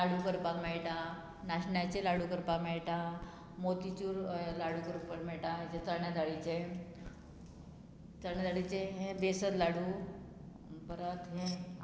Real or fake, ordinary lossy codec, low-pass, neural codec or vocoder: real; none; none; none